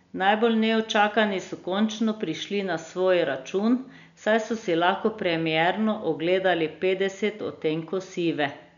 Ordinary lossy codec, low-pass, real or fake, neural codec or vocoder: none; 7.2 kHz; real; none